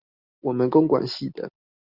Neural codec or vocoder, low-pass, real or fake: none; 5.4 kHz; real